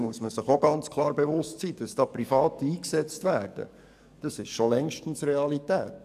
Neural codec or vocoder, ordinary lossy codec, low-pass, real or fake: codec, 44.1 kHz, 7.8 kbps, DAC; none; 14.4 kHz; fake